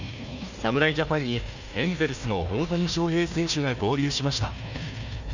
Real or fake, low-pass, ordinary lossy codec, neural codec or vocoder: fake; 7.2 kHz; none; codec, 16 kHz, 1 kbps, FunCodec, trained on Chinese and English, 50 frames a second